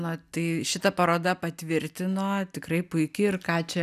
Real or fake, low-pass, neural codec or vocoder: real; 14.4 kHz; none